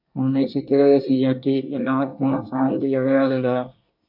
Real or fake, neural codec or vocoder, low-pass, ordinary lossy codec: fake; codec, 24 kHz, 1 kbps, SNAC; 5.4 kHz; AAC, 48 kbps